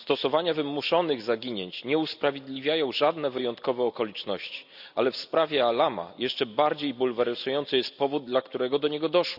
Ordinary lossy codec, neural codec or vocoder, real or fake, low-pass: none; none; real; 5.4 kHz